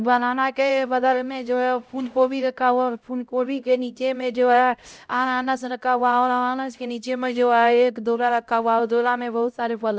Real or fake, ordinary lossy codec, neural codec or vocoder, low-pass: fake; none; codec, 16 kHz, 0.5 kbps, X-Codec, HuBERT features, trained on LibriSpeech; none